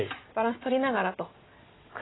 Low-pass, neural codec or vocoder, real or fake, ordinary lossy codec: 7.2 kHz; none; real; AAC, 16 kbps